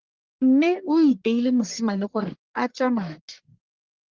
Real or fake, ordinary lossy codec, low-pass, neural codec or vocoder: fake; Opus, 16 kbps; 7.2 kHz; codec, 44.1 kHz, 1.7 kbps, Pupu-Codec